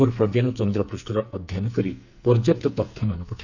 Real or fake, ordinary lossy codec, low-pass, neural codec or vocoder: fake; none; 7.2 kHz; codec, 44.1 kHz, 2.6 kbps, SNAC